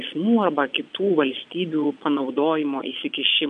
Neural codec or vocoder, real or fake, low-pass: vocoder, 22.05 kHz, 80 mel bands, Vocos; fake; 9.9 kHz